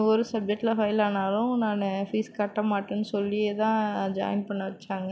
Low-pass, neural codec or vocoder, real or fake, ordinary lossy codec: none; none; real; none